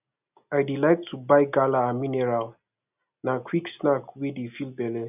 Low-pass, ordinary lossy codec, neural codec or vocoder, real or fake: 3.6 kHz; none; none; real